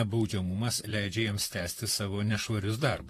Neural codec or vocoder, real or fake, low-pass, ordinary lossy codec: vocoder, 44.1 kHz, 128 mel bands, Pupu-Vocoder; fake; 14.4 kHz; AAC, 48 kbps